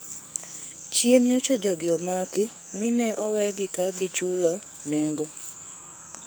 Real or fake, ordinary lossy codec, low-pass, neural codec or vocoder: fake; none; none; codec, 44.1 kHz, 2.6 kbps, SNAC